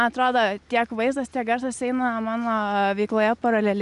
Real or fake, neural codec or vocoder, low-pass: real; none; 10.8 kHz